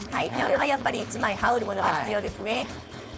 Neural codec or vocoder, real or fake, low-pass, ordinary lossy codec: codec, 16 kHz, 4.8 kbps, FACodec; fake; none; none